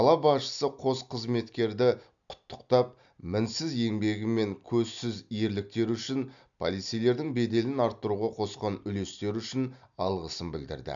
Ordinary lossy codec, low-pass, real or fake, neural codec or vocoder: none; 7.2 kHz; real; none